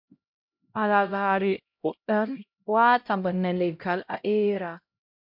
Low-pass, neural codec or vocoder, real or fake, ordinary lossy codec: 5.4 kHz; codec, 16 kHz, 0.5 kbps, X-Codec, HuBERT features, trained on LibriSpeech; fake; MP3, 48 kbps